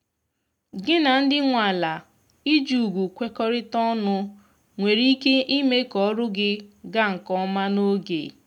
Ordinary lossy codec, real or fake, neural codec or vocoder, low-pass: none; real; none; 19.8 kHz